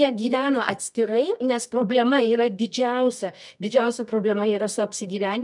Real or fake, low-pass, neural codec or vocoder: fake; 10.8 kHz; codec, 24 kHz, 0.9 kbps, WavTokenizer, medium music audio release